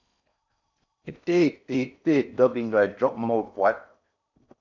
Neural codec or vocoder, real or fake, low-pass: codec, 16 kHz in and 24 kHz out, 0.6 kbps, FocalCodec, streaming, 4096 codes; fake; 7.2 kHz